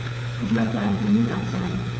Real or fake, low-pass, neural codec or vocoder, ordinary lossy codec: fake; none; codec, 16 kHz, 4 kbps, FunCodec, trained on LibriTTS, 50 frames a second; none